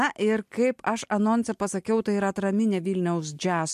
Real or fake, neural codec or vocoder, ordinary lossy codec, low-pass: real; none; MP3, 64 kbps; 14.4 kHz